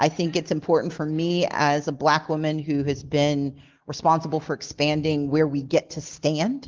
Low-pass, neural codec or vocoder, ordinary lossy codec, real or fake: 7.2 kHz; none; Opus, 16 kbps; real